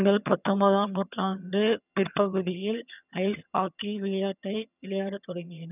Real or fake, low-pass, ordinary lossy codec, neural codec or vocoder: fake; 3.6 kHz; none; vocoder, 22.05 kHz, 80 mel bands, HiFi-GAN